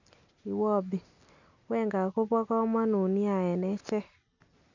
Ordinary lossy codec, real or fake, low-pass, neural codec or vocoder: none; real; 7.2 kHz; none